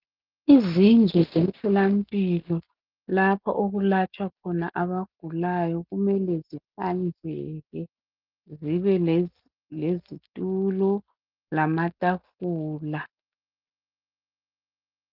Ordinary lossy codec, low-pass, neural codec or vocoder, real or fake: Opus, 32 kbps; 5.4 kHz; none; real